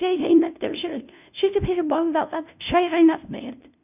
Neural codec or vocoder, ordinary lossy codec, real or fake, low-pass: codec, 16 kHz, 0.5 kbps, FunCodec, trained on LibriTTS, 25 frames a second; none; fake; 3.6 kHz